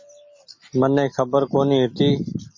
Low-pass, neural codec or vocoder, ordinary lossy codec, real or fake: 7.2 kHz; none; MP3, 32 kbps; real